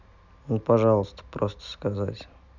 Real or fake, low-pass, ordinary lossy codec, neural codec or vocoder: real; 7.2 kHz; none; none